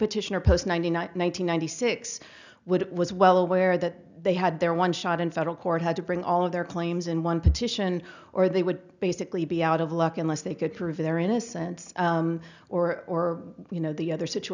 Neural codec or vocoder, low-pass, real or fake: none; 7.2 kHz; real